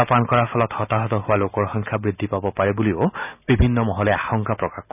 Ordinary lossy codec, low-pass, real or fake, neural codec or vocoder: none; 3.6 kHz; real; none